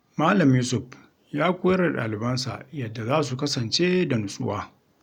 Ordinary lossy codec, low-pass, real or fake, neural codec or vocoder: none; 19.8 kHz; real; none